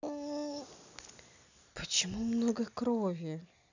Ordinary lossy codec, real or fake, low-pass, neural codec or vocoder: none; real; 7.2 kHz; none